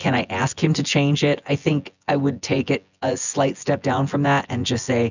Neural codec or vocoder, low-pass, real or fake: vocoder, 24 kHz, 100 mel bands, Vocos; 7.2 kHz; fake